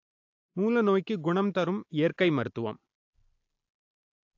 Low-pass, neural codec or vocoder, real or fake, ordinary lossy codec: 7.2 kHz; none; real; AAC, 48 kbps